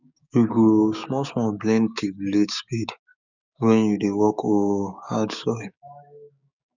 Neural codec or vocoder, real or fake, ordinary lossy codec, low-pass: codec, 16 kHz, 6 kbps, DAC; fake; none; 7.2 kHz